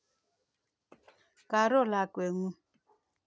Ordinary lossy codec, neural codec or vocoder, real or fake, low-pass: none; none; real; none